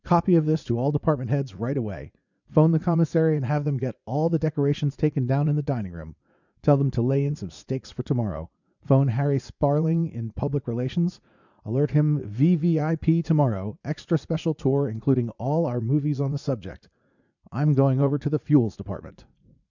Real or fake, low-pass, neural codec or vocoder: fake; 7.2 kHz; vocoder, 44.1 kHz, 80 mel bands, Vocos